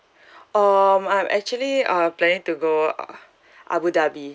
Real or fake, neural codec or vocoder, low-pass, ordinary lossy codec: real; none; none; none